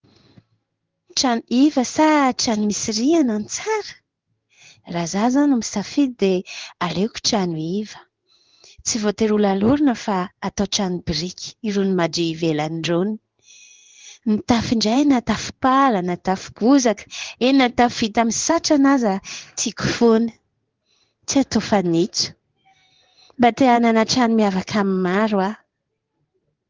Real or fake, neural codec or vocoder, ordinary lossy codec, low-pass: fake; codec, 16 kHz in and 24 kHz out, 1 kbps, XY-Tokenizer; Opus, 24 kbps; 7.2 kHz